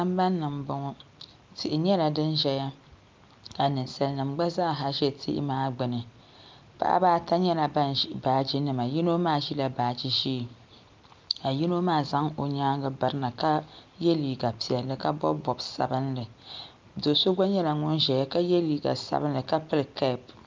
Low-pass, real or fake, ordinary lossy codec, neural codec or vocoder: 7.2 kHz; real; Opus, 24 kbps; none